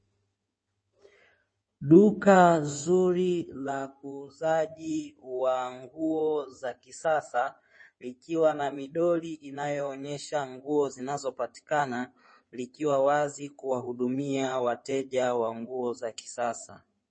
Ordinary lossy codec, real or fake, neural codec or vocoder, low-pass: MP3, 32 kbps; fake; codec, 16 kHz in and 24 kHz out, 2.2 kbps, FireRedTTS-2 codec; 9.9 kHz